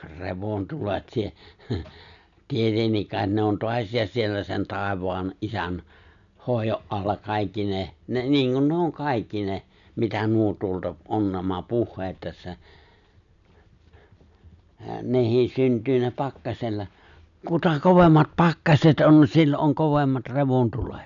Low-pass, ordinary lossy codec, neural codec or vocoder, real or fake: 7.2 kHz; none; none; real